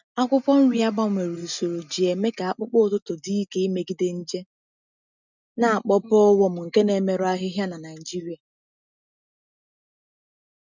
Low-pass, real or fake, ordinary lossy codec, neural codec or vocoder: 7.2 kHz; real; none; none